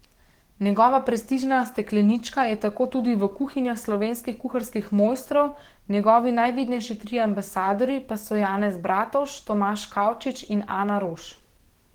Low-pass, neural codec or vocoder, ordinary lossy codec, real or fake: 19.8 kHz; codec, 44.1 kHz, 7.8 kbps, DAC; Opus, 16 kbps; fake